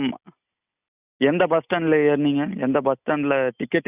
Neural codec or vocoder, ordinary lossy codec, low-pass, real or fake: none; none; 3.6 kHz; real